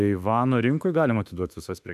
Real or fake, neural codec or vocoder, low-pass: fake; autoencoder, 48 kHz, 32 numbers a frame, DAC-VAE, trained on Japanese speech; 14.4 kHz